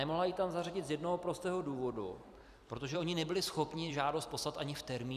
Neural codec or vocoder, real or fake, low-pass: vocoder, 44.1 kHz, 128 mel bands every 256 samples, BigVGAN v2; fake; 14.4 kHz